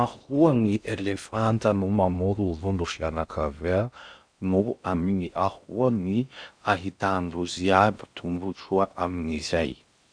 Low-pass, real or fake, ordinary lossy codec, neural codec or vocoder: 9.9 kHz; fake; none; codec, 16 kHz in and 24 kHz out, 0.6 kbps, FocalCodec, streaming, 2048 codes